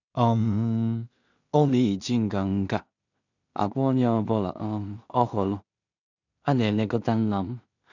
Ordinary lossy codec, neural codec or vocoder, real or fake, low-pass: none; codec, 16 kHz in and 24 kHz out, 0.4 kbps, LongCat-Audio-Codec, two codebook decoder; fake; 7.2 kHz